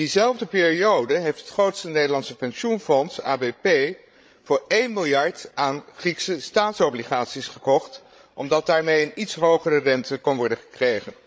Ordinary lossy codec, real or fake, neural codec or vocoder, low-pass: none; fake; codec, 16 kHz, 16 kbps, FreqCodec, larger model; none